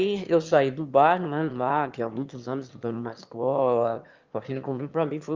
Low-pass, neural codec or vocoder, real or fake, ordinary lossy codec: 7.2 kHz; autoencoder, 22.05 kHz, a latent of 192 numbers a frame, VITS, trained on one speaker; fake; Opus, 32 kbps